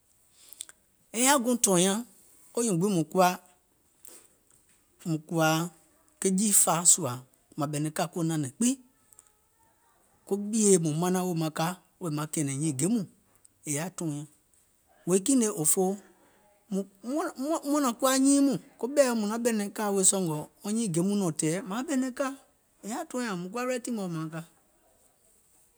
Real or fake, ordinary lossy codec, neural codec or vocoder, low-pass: real; none; none; none